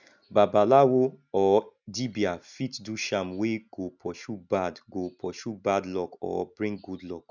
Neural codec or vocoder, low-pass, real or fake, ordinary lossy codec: none; 7.2 kHz; real; none